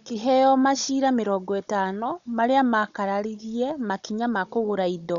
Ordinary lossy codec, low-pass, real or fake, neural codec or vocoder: none; 7.2 kHz; fake; codec, 16 kHz, 16 kbps, FunCodec, trained on Chinese and English, 50 frames a second